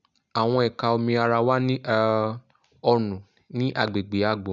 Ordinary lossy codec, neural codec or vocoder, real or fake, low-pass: none; none; real; 7.2 kHz